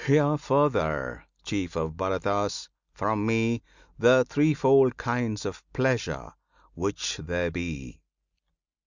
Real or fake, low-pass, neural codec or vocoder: real; 7.2 kHz; none